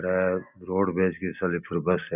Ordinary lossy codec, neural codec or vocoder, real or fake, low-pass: none; none; real; 3.6 kHz